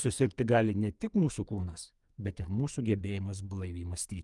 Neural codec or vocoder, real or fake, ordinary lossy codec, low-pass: codec, 44.1 kHz, 2.6 kbps, SNAC; fake; Opus, 32 kbps; 10.8 kHz